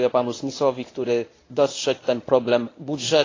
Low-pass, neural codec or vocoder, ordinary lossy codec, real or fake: 7.2 kHz; codec, 24 kHz, 0.9 kbps, WavTokenizer, medium speech release version 1; AAC, 32 kbps; fake